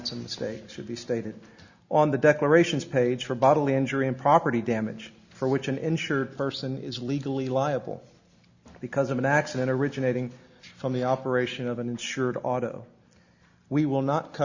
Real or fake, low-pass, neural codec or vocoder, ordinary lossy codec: real; 7.2 kHz; none; Opus, 64 kbps